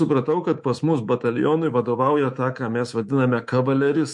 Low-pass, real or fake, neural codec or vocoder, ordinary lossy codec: 10.8 kHz; fake; codec, 24 kHz, 3.1 kbps, DualCodec; MP3, 48 kbps